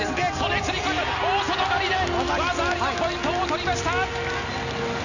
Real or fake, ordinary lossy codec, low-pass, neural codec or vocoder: real; none; 7.2 kHz; none